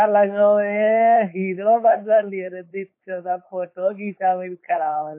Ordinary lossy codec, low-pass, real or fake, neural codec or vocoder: MP3, 24 kbps; 3.6 kHz; fake; codec, 16 kHz, 4.8 kbps, FACodec